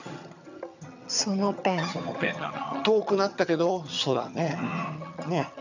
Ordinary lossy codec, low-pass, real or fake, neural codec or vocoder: none; 7.2 kHz; fake; vocoder, 22.05 kHz, 80 mel bands, HiFi-GAN